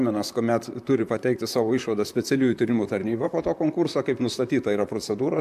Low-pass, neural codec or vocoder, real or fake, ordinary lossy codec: 14.4 kHz; vocoder, 44.1 kHz, 128 mel bands, Pupu-Vocoder; fake; MP3, 96 kbps